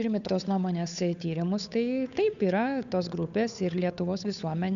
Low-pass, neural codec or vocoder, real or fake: 7.2 kHz; codec, 16 kHz, 8 kbps, FunCodec, trained on Chinese and English, 25 frames a second; fake